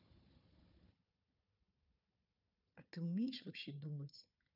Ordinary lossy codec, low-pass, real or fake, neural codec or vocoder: none; 5.4 kHz; fake; codec, 16 kHz, 4 kbps, FunCodec, trained on Chinese and English, 50 frames a second